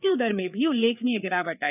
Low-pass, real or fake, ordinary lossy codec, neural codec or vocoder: 3.6 kHz; fake; none; codec, 16 kHz, 4 kbps, FreqCodec, larger model